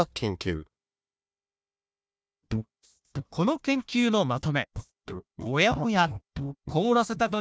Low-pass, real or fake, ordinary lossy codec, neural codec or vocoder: none; fake; none; codec, 16 kHz, 1 kbps, FunCodec, trained on Chinese and English, 50 frames a second